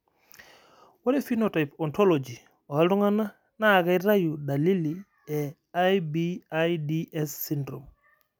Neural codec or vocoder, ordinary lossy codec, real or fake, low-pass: none; none; real; none